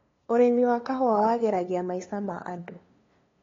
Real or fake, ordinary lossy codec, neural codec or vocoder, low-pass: fake; AAC, 32 kbps; codec, 16 kHz, 2 kbps, FunCodec, trained on LibriTTS, 25 frames a second; 7.2 kHz